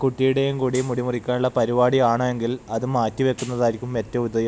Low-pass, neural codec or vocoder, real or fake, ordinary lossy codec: none; none; real; none